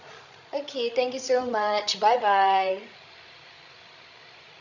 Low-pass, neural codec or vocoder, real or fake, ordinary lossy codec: 7.2 kHz; codec, 16 kHz, 16 kbps, FreqCodec, larger model; fake; none